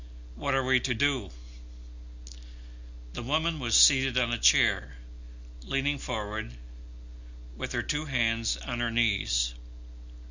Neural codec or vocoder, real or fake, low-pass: none; real; 7.2 kHz